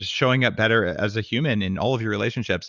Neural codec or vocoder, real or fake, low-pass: none; real; 7.2 kHz